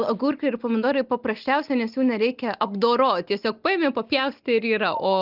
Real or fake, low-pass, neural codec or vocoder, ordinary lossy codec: real; 5.4 kHz; none; Opus, 24 kbps